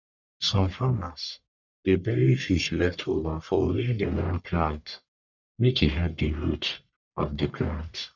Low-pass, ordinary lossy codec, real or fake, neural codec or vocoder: 7.2 kHz; none; fake; codec, 44.1 kHz, 1.7 kbps, Pupu-Codec